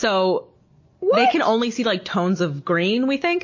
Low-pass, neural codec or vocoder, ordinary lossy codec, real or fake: 7.2 kHz; none; MP3, 32 kbps; real